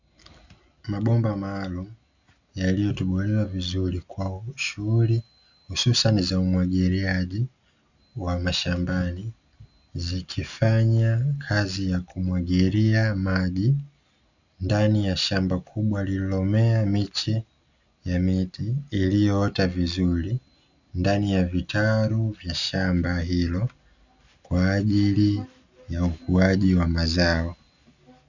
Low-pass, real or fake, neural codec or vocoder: 7.2 kHz; real; none